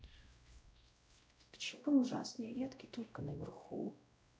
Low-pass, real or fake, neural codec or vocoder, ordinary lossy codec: none; fake; codec, 16 kHz, 0.5 kbps, X-Codec, WavLM features, trained on Multilingual LibriSpeech; none